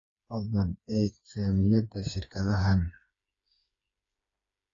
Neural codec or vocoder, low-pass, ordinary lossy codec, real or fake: codec, 16 kHz, 4 kbps, FreqCodec, smaller model; 7.2 kHz; AAC, 32 kbps; fake